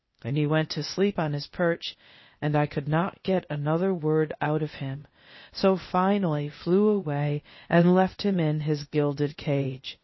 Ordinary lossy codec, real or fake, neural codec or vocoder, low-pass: MP3, 24 kbps; fake; codec, 16 kHz, 0.8 kbps, ZipCodec; 7.2 kHz